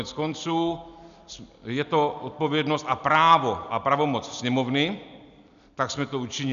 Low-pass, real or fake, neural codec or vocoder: 7.2 kHz; real; none